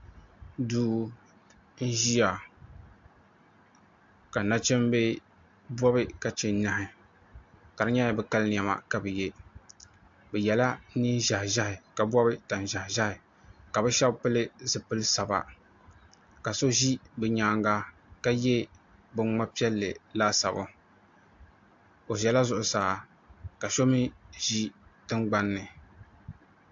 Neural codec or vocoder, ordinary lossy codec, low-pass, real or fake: none; AAC, 64 kbps; 7.2 kHz; real